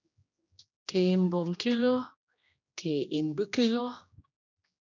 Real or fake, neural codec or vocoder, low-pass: fake; codec, 16 kHz, 1 kbps, X-Codec, HuBERT features, trained on general audio; 7.2 kHz